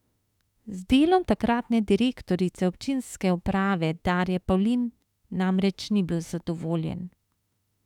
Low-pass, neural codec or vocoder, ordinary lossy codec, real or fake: 19.8 kHz; autoencoder, 48 kHz, 32 numbers a frame, DAC-VAE, trained on Japanese speech; none; fake